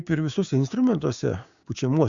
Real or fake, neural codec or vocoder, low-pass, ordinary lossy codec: fake; codec, 16 kHz, 6 kbps, DAC; 7.2 kHz; Opus, 64 kbps